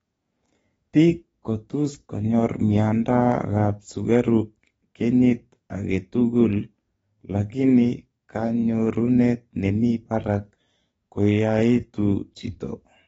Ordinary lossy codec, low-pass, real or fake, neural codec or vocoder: AAC, 24 kbps; 19.8 kHz; fake; codec, 44.1 kHz, 7.8 kbps, DAC